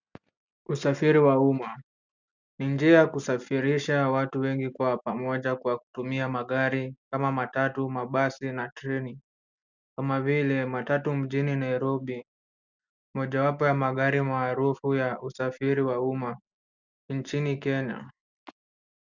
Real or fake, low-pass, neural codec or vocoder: real; 7.2 kHz; none